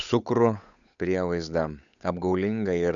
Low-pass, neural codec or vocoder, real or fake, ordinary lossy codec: 7.2 kHz; codec, 16 kHz, 8 kbps, FunCodec, trained on Chinese and English, 25 frames a second; fake; MP3, 96 kbps